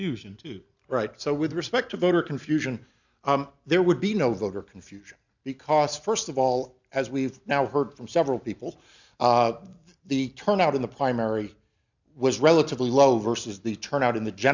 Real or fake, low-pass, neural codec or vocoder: real; 7.2 kHz; none